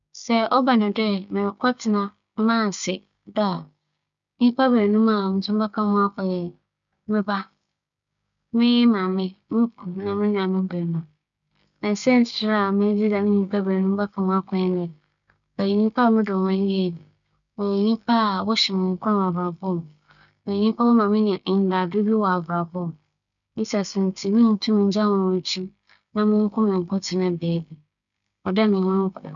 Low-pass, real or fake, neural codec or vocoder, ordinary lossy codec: 7.2 kHz; fake; codec, 16 kHz, 6 kbps, DAC; none